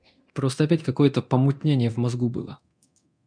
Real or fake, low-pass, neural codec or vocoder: fake; 9.9 kHz; codec, 24 kHz, 0.9 kbps, DualCodec